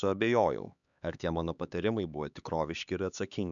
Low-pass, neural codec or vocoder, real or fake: 7.2 kHz; codec, 16 kHz, 4 kbps, X-Codec, HuBERT features, trained on LibriSpeech; fake